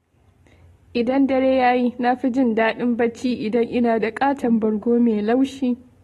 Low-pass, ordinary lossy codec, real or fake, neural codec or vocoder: 19.8 kHz; AAC, 32 kbps; real; none